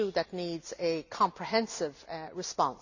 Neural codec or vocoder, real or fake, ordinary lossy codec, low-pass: none; real; none; 7.2 kHz